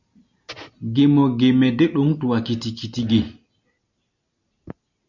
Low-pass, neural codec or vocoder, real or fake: 7.2 kHz; none; real